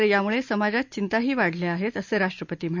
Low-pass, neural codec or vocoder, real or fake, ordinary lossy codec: 7.2 kHz; none; real; MP3, 64 kbps